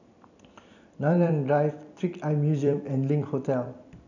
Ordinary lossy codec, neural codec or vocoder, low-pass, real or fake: none; vocoder, 44.1 kHz, 128 mel bands every 256 samples, BigVGAN v2; 7.2 kHz; fake